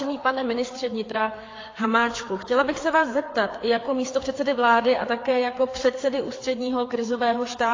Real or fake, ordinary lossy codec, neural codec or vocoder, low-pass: fake; AAC, 32 kbps; codec, 16 kHz, 4 kbps, FreqCodec, larger model; 7.2 kHz